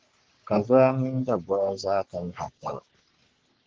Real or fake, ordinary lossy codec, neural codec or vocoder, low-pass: fake; Opus, 16 kbps; codec, 44.1 kHz, 3.4 kbps, Pupu-Codec; 7.2 kHz